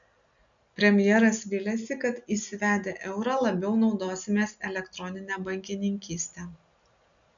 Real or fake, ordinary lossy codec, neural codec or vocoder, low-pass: real; MP3, 96 kbps; none; 7.2 kHz